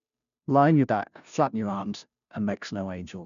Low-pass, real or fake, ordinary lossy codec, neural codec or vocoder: 7.2 kHz; fake; none; codec, 16 kHz, 0.5 kbps, FunCodec, trained on Chinese and English, 25 frames a second